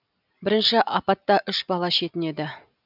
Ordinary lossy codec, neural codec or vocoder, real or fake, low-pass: none; none; real; 5.4 kHz